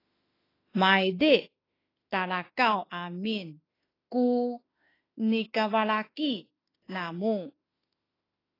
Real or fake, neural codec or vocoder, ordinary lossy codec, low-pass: fake; autoencoder, 48 kHz, 32 numbers a frame, DAC-VAE, trained on Japanese speech; AAC, 24 kbps; 5.4 kHz